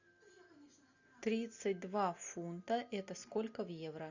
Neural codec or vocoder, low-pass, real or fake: none; 7.2 kHz; real